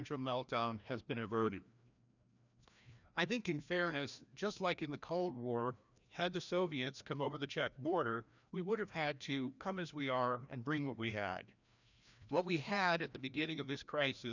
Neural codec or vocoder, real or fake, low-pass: codec, 16 kHz, 1 kbps, FreqCodec, larger model; fake; 7.2 kHz